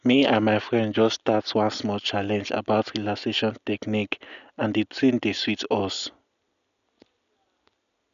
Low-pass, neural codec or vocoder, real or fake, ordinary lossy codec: 7.2 kHz; none; real; none